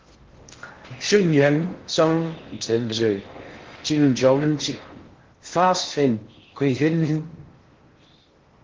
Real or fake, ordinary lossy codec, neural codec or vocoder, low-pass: fake; Opus, 16 kbps; codec, 16 kHz in and 24 kHz out, 0.6 kbps, FocalCodec, streaming, 4096 codes; 7.2 kHz